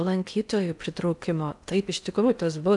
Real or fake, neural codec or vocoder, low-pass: fake; codec, 16 kHz in and 24 kHz out, 0.6 kbps, FocalCodec, streaming, 4096 codes; 10.8 kHz